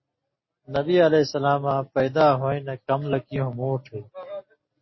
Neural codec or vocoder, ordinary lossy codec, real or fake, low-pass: none; MP3, 24 kbps; real; 7.2 kHz